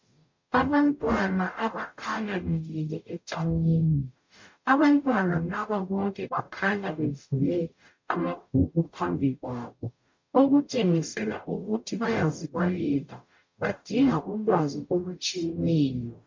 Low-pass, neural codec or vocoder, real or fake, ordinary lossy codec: 7.2 kHz; codec, 44.1 kHz, 0.9 kbps, DAC; fake; MP3, 48 kbps